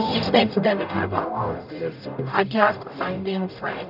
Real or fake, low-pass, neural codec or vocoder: fake; 5.4 kHz; codec, 44.1 kHz, 0.9 kbps, DAC